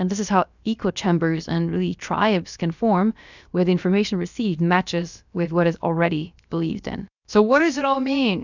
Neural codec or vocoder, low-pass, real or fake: codec, 16 kHz, about 1 kbps, DyCAST, with the encoder's durations; 7.2 kHz; fake